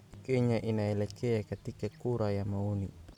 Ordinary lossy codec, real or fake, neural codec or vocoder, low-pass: none; real; none; 19.8 kHz